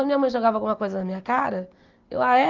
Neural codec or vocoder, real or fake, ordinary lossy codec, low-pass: none; real; Opus, 32 kbps; 7.2 kHz